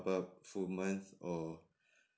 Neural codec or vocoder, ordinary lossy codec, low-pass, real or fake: none; none; none; real